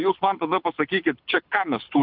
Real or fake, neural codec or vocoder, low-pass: fake; codec, 24 kHz, 6 kbps, HILCodec; 5.4 kHz